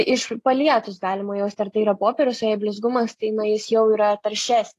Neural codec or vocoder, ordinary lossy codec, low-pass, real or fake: none; AAC, 48 kbps; 14.4 kHz; real